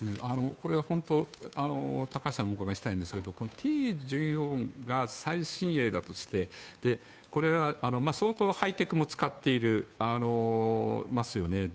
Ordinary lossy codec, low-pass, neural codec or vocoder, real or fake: none; none; codec, 16 kHz, 2 kbps, FunCodec, trained on Chinese and English, 25 frames a second; fake